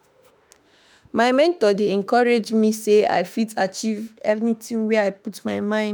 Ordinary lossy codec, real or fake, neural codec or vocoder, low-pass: none; fake; autoencoder, 48 kHz, 32 numbers a frame, DAC-VAE, trained on Japanese speech; none